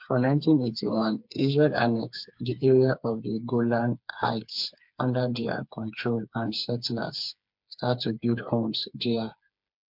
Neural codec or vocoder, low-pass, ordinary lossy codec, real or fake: codec, 16 kHz, 4 kbps, FreqCodec, smaller model; 5.4 kHz; MP3, 48 kbps; fake